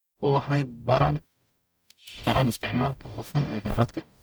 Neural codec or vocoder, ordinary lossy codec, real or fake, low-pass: codec, 44.1 kHz, 0.9 kbps, DAC; none; fake; none